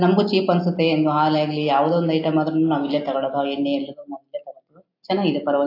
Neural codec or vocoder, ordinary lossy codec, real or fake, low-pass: none; AAC, 32 kbps; real; 5.4 kHz